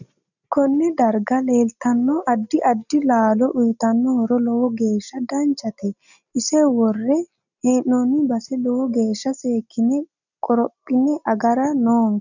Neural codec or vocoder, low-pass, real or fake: none; 7.2 kHz; real